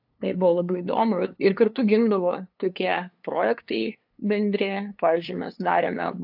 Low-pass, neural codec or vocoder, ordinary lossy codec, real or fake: 5.4 kHz; codec, 16 kHz, 2 kbps, FunCodec, trained on LibriTTS, 25 frames a second; AAC, 48 kbps; fake